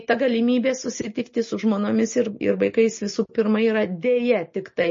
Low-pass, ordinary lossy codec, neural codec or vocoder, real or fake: 7.2 kHz; MP3, 32 kbps; none; real